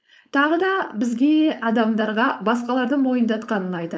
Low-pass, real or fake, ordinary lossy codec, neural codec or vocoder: none; fake; none; codec, 16 kHz, 4.8 kbps, FACodec